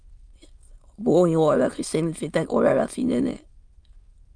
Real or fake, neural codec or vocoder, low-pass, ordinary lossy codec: fake; autoencoder, 22.05 kHz, a latent of 192 numbers a frame, VITS, trained on many speakers; 9.9 kHz; Opus, 32 kbps